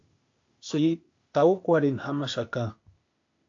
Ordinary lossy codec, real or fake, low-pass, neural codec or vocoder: AAC, 48 kbps; fake; 7.2 kHz; codec, 16 kHz, 0.8 kbps, ZipCodec